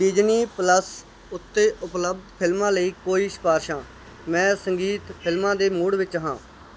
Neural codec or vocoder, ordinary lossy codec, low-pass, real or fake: none; none; none; real